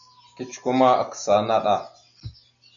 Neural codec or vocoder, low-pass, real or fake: none; 7.2 kHz; real